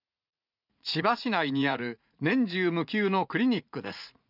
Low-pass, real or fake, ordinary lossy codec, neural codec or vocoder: 5.4 kHz; fake; none; vocoder, 44.1 kHz, 80 mel bands, Vocos